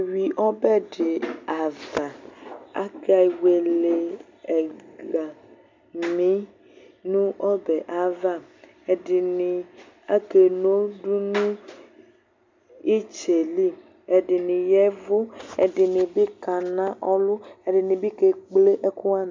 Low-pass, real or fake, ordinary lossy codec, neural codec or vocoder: 7.2 kHz; real; MP3, 64 kbps; none